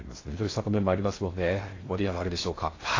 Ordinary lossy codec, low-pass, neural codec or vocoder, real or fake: AAC, 32 kbps; 7.2 kHz; codec, 16 kHz in and 24 kHz out, 0.6 kbps, FocalCodec, streaming, 2048 codes; fake